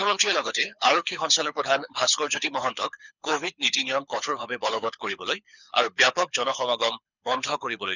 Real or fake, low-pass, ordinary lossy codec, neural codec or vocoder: fake; 7.2 kHz; none; codec, 24 kHz, 6 kbps, HILCodec